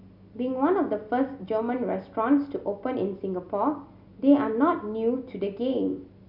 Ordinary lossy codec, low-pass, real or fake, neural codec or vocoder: none; 5.4 kHz; real; none